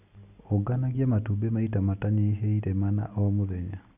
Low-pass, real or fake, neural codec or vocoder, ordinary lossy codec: 3.6 kHz; real; none; none